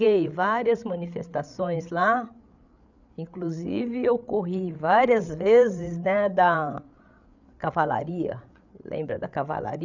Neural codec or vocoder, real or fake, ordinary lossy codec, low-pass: codec, 16 kHz, 8 kbps, FreqCodec, larger model; fake; none; 7.2 kHz